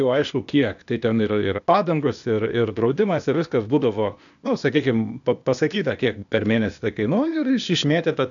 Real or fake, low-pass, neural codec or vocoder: fake; 7.2 kHz; codec, 16 kHz, 0.8 kbps, ZipCodec